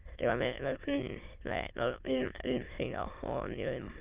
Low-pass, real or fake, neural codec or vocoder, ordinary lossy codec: 3.6 kHz; fake; autoencoder, 22.05 kHz, a latent of 192 numbers a frame, VITS, trained on many speakers; Opus, 32 kbps